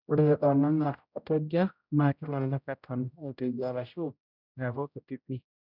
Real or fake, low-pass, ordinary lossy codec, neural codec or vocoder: fake; 5.4 kHz; none; codec, 16 kHz, 0.5 kbps, X-Codec, HuBERT features, trained on general audio